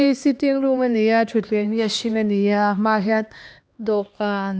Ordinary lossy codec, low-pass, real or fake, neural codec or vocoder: none; none; fake; codec, 16 kHz, 2 kbps, X-Codec, HuBERT features, trained on LibriSpeech